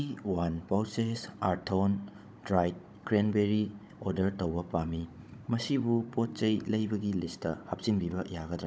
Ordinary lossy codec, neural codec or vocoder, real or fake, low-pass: none; codec, 16 kHz, 16 kbps, FunCodec, trained on Chinese and English, 50 frames a second; fake; none